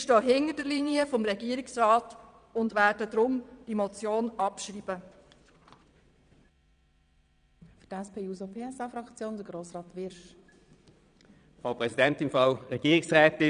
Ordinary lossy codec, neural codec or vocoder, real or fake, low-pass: none; vocoder, 22.05 kHz, 80 mel bands, Vocos; fake; 9.9 kHz